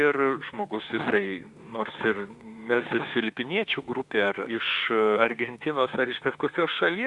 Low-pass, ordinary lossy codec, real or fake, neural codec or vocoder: 10.8 kHz; Opus, 64 kbps; fake; autoencoder, 48 kHz, 32 numbers a frame, DAC-VAE, trained on Japanese speech